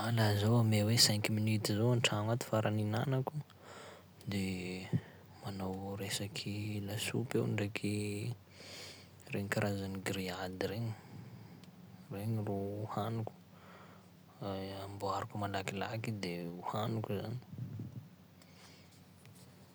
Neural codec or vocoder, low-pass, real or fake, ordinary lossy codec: none; none; real; none